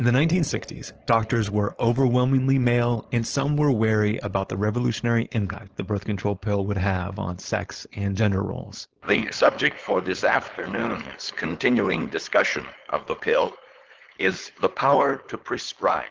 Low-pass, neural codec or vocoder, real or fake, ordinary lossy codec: 7.2 kHz; codec, 16 kHz, 4.8 kbps, FACodec; fake; Opus, 16 kbps